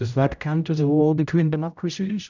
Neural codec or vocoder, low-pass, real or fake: codec, 16 kHz, 0.5 kbps, X-Codec, HuBERT features, trained on general audio; 7.2 kHz; fake